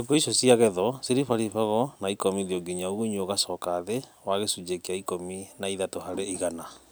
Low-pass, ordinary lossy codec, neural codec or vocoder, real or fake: none; none; none; real